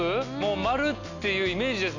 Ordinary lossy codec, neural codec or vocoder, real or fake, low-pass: none; none; real; 7.2 kHz